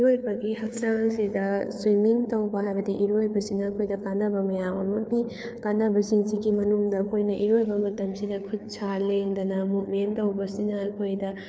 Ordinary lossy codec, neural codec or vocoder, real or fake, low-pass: none; codec, 16 kHz, 4 kbps, FreqCodec, larger model; fake; none